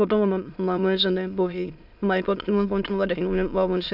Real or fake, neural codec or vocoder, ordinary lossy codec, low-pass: fake; autoencoder, 22.05 kHz, a latent of 192 numbers a frame, VITS, trained on many speakers; none; 5.4 kHz